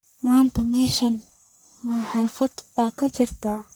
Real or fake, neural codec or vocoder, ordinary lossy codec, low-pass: fake; codec, 44.1 kHz, 1.7 kbps, Pupu-Codec; none; none